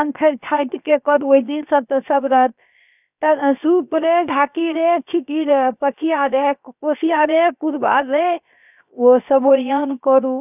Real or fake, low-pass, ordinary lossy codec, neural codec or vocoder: fake; 3.6 kHz; none; codec, 16 kHz, about 1 kbps, DyCAST, with the encoder's durations